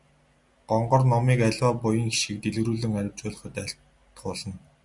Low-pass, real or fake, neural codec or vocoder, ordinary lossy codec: 10.8 kHz; real; none; Opus, 64 kbps